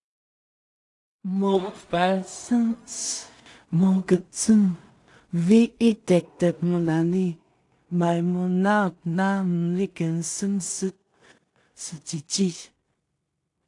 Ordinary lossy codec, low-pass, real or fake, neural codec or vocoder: AAC, 64 kbps; 10.8 kHz; fake; codec, 16 kHz in and 24 kHz out, 0.4 kbps, LongCat-Audio-Codec, two codebook decoder